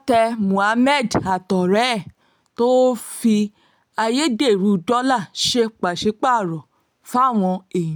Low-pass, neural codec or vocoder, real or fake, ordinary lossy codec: none; none; real; none